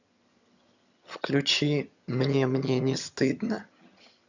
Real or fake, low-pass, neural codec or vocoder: fake; 7.2 kHz; vocoder, 22.05 kHz, 80 mel bands, HiFi-GAN